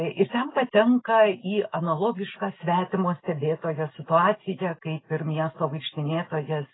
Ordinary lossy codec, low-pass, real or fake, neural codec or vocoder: AAC, 16 kbps; 7.2 kHz; real; none